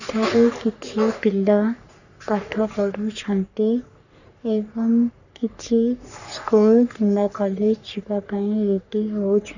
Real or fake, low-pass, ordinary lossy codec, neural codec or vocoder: fake; 7.2 kHz; none; codec, 44.1 kHz, 3.4 kbps, Pupu-Codec